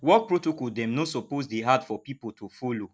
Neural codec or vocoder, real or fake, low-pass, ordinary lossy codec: none; real; none; none